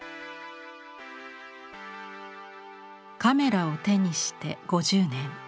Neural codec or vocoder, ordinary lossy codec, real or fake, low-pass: none; none; real; none